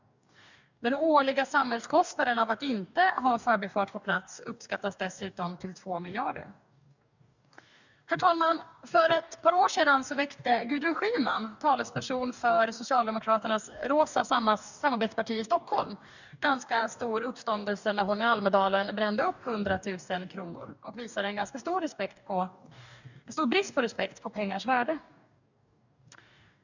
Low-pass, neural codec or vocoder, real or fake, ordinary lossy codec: 7.2 kHz; codec, 44.1 kHz, 2.6 kbps, DAC; fake; none